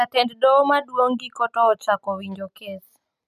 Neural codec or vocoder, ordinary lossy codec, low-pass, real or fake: none; none; 14.4 kHz; real